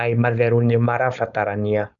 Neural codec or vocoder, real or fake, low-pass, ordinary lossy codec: codec, 16 kHz, 4.8 kbps, FACodec; fake; 7.2 kHz; none